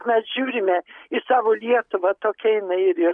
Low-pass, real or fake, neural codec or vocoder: 9.9 kHz; real; none